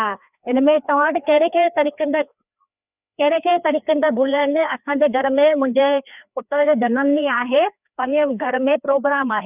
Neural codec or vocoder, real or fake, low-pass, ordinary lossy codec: codec, 16 kHz, 2 kbps, FreqCodec, larger model; fake; 3.6 kHz; none